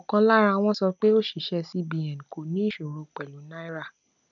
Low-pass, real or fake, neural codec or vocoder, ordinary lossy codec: 7.2 kHz; real; none; none